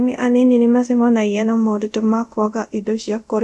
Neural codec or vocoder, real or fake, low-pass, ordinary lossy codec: codec, 24 kHz, 0.5 kbps, DualCodec; fake; none; none